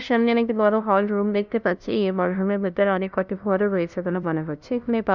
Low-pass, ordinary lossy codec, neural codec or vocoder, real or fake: 7.2 kHz; none; codec, 16 kHz, 0.5 kbps, FunCodec, trained on LibriTTS, 25 frames a second; fake